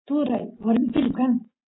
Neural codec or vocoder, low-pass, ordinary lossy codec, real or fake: none; 7.2 kHz; AAC, 16 kbps; real